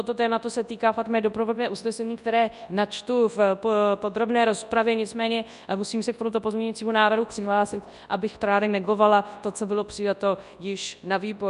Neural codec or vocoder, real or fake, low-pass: codec, 24 kHz, 0.9 kbps, WavTokenizer, large speech release; fake; 10.8 kHz